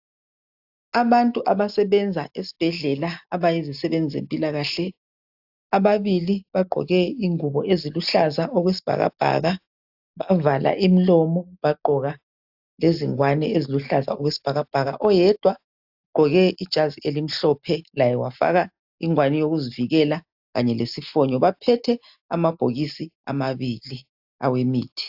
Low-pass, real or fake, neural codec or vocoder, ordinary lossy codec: 5.4 kHz; real; none; AAC, 48 kbps